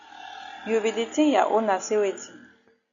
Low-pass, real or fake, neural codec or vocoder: 7.2 kHz; real; none